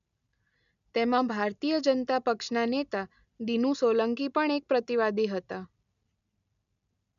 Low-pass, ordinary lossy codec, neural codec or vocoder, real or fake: 7.2 kHz; none; none; real